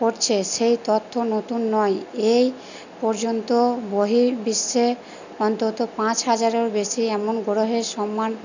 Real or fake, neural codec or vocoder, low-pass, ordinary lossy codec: real; none; 7.2 kHz; none